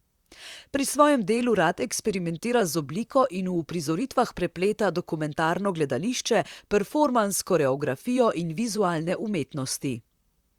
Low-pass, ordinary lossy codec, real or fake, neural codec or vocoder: 19.8 kHz; Opus, 64 kbps; fake; vocoder, 44.1 kHz, 128 mel bands, Pupu-Vocoder